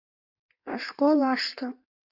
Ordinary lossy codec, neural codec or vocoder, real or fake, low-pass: Opus, 64 kbps; codec, 16 kHz in and 24 kHz out, 1.1 kbps, FireRedTTS-2 codec; fake; 5.4 kHz